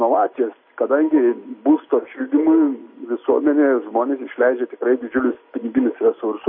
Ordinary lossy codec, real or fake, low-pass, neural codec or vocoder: MP3, 24 kbps; real; 5.4 kHz; none